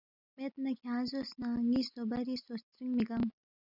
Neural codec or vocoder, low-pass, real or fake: none; 5.4 kHz; real